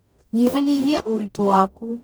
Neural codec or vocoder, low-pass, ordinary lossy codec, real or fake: codec, 44.1 kHz, 0.9 kbps, DAC; none; none; fake